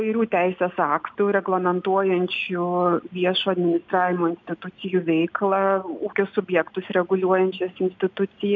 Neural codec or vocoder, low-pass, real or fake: none; 7.2 kHz; real